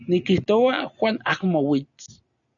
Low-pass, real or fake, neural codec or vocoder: 7.2 kHz; real; none